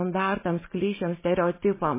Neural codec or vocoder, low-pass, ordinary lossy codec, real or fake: none; 3.6 kHz; MP3, 16 kbps; real